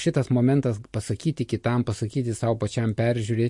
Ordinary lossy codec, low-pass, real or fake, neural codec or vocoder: MP3, 48 kbps; 10.8 kHz; real; none